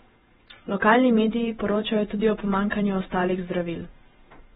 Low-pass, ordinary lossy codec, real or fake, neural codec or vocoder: 19.8 kHz; AAC, 16 kbps; fake; vocoder, 48 kHz, 128 mel bands, Vocos